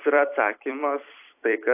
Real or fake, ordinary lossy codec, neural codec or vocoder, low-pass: fake; AAC, 24 kbps; vocoder, 44.1 kHz, 128 mel bands every 256 samples, BigVGAN v2; 3.6 kHz